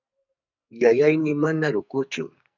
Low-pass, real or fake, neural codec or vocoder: 7.2 kHz; fake; codec, 44.1 kHz, 2.6 kbps, SNAC